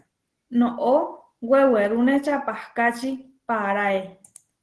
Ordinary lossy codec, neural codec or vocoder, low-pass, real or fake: Opus, 16 kbps; none; 10.8 kHz; real